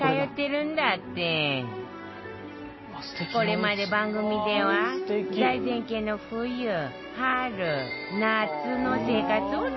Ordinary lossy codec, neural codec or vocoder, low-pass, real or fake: MP3, 24 kbps; none; 7.2 kHz; real